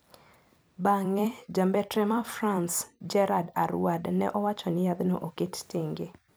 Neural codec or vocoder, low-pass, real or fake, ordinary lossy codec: vocoder, 44.1 kHz, 128 mel bands every 256 samples, BigVGAN v2; none; fake; none